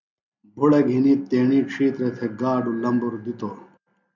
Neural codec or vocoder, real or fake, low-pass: none; real; 7.2 kHz